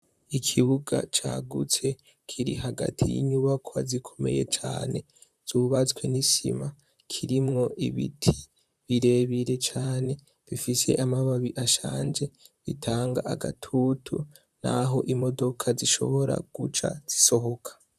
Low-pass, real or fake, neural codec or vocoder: 14.4 kHz; fake; vocoder, 44.1 kHz, 128 mel bands, Pupu-Vocoder